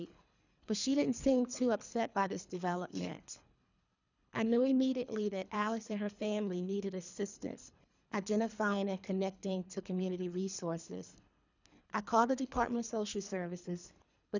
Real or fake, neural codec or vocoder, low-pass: fake; codec, 24 kHz, 3 kbps, HILCodec; 7.2 kHz